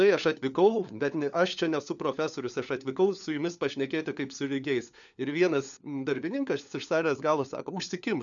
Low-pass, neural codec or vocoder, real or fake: 7.2 kHz; codec, 16 kHz, 4 kbps, FunCodec, trained on LibriTTS, 50 frames a second; fake